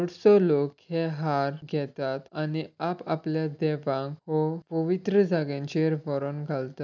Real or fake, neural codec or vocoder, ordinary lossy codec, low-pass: real; none; none; 7.2 kHz